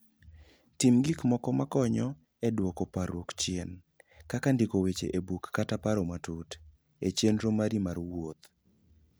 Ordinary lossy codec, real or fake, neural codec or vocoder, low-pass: none; real; none; none